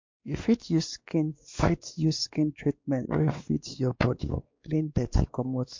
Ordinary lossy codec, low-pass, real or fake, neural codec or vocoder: MP3, 48 kbps; 7.2 kHz; fake; codec, 16 kHz, 2 kbps, X-Codec, WavLM features, trained on Multilingual LibriSpeech